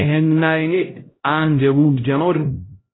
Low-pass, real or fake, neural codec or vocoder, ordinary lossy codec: 7.2 kHz; fake; codec, 16 kHz, 0.5 kbps, X-Codec, HuBERT features, trained on LibriSpeech; AAC, 16 kbps